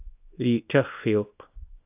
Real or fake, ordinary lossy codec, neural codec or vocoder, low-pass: fake; AAC, 32 kbps; codec, 24 kHz, 0.9 kbps, WavTokenizer, small release; 3.6 kHz